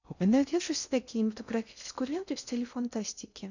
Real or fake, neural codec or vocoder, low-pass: fake; codec, 16 kHz in and 24 kHz out, 0.6 kbps, FocalCodec, streaming, 2048 codes; 7.2 kHz